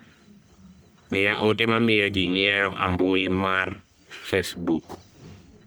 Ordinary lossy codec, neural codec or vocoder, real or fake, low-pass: none; codec, 44.1 kHz, 1.7 kbps, Pupu-Codec; fake; none